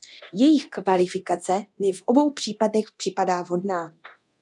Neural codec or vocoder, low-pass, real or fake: codec, 24 kHz, 0.9 kbps, DualCodec; 10.8 kHz; fake